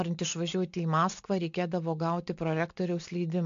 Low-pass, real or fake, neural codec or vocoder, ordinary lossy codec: 7.2 kHz; real; none; AAC, 64 kbps